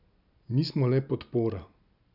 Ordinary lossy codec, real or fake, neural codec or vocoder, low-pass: none; real; none; 5.4 kHz